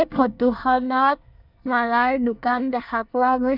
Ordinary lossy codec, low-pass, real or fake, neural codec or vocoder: none; 5.4 kHz; fake; codec, 24 kHz, 1 kbps, SNAC